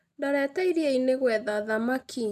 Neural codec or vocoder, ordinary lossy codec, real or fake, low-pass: none; none; real; 14.4 kHz